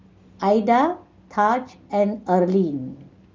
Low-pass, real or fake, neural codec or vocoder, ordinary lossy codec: 7.2 kHz; real; none; Opus, 32 kbps